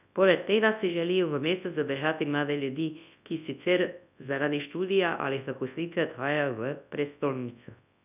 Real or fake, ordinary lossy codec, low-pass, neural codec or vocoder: fake; none; 3.6 kHz; codec, 24 kHz, 0.9 kbps, WavTokenizer, large speech release